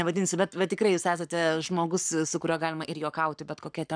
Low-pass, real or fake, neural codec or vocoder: 9.9 kHz; fake; codec, 44.1 kHz, 7.8 kbps, Pupu-Codec